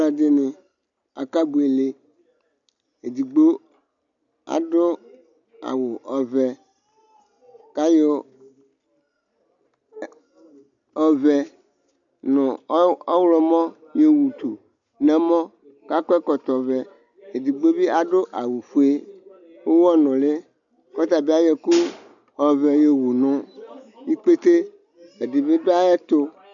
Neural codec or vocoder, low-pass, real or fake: none; 7.2 kHz; real